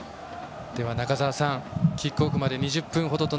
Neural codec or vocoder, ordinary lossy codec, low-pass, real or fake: none; none; none; real